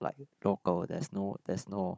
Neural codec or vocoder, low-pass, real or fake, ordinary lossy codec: codec, 16 kHz, 16 kbps, FunCodec, trained on Chinese and English, 50 frames a second; none; fake; none